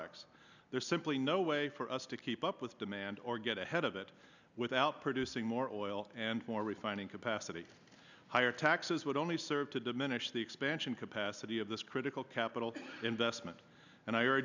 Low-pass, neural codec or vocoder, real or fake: 7.2 kHz; none; real